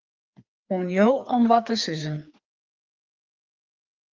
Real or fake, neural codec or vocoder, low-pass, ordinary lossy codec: fake; codec, 16 kHz, 2 kbps, FreqCodec, larger model; 7.2 kHz; Opus, 24 kbps